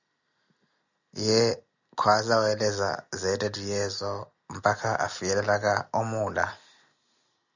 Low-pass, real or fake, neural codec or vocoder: 7.2 kHz; real; none